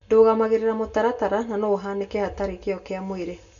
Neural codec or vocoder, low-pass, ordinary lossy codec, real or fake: none; 7.2 kHz; none; real